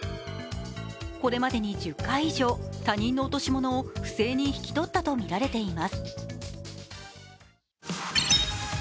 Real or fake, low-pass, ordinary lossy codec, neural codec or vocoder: real; none; none; none